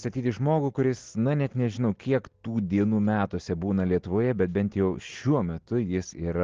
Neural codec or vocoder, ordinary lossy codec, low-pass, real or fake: none; Opus, 16 kbps; 7.2 kHz; real